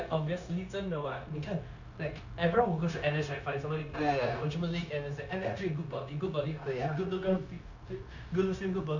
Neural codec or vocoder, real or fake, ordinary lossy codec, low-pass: codec, 16 kHz, 0.9 kbps, LongCat-Audio-Codec; fake; none; 7.2 kHz